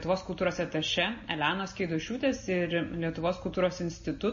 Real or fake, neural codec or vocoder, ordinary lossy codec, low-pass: real; none; MP3, 32 kbps; 7.2 kHz